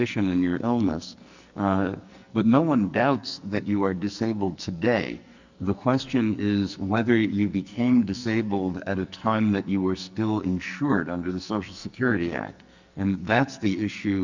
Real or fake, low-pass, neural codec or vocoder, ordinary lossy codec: fake; 7.2 kHz; codec, 44.1 kHz, 2.6 kbps, SNAC; Opus, 64 kbps